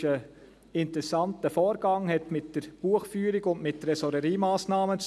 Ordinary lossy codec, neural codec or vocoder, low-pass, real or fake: none; none; none; real